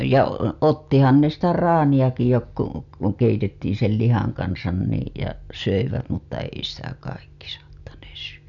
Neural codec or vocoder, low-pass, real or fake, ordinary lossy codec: none; 7.2 kHz; real; none